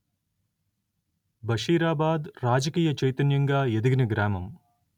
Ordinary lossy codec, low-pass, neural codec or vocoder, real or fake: none; 19.8 kHz; none; real